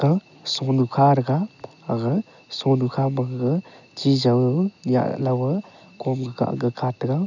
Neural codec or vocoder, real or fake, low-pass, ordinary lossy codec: none; real; 7.2 kHz; none